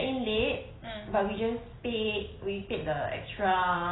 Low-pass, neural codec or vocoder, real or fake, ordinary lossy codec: 7.2 kHz; none; real; AAC, 16 kbps